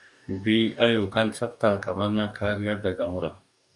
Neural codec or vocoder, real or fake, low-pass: codec, 44.1 kHz, 2.6 kbps, DAC; fake; 10.8 kHz